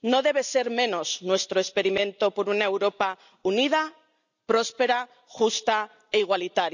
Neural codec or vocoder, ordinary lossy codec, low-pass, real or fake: none; none; 7.2 kHz; real